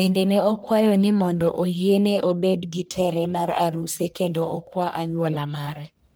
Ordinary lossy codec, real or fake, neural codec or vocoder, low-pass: none; fake; codec, 44.1 kHz, 1.7 kbps, Pupu-Codec; none